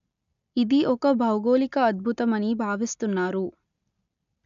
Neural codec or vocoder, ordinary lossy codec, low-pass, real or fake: none; none; 7.2 kHz; real